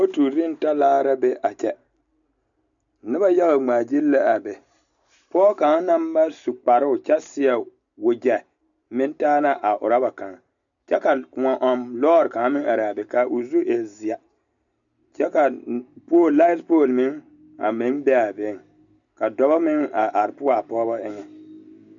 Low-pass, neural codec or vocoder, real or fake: 7.2 kHz; none; real